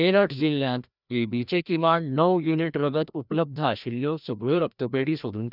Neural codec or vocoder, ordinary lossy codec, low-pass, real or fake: codec, 16 kHz, 1 kbps, FreqCodec, larger model; none; 5.4 kHz; fake